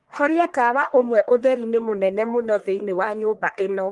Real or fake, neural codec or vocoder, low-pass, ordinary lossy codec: fake; codec, 44.1 kHz, 1.7 kbps, Pupu-Codec; 10.8 kHz; Opus, 24 kbps